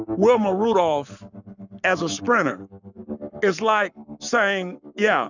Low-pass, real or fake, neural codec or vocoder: 7.2 kHz; fake; codec, 44.1 kHz, 7.8 kbps, Pupu-Codec